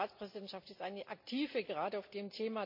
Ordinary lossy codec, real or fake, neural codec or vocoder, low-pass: MP3, 48 kbps; real; none; 5.4 kHz